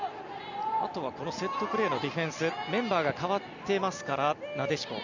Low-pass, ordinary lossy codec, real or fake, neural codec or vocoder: 7.2 kHz; none; real; none